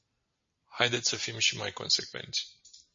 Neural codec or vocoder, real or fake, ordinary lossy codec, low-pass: none; real; MP3, 32 kbps; 7.2 kHz